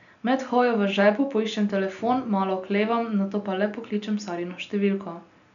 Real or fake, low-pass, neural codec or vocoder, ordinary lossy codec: real; 7.2 kHz; none; none